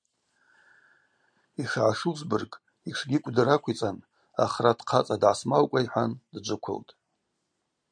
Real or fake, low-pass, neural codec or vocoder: real; 9.9 kHz; none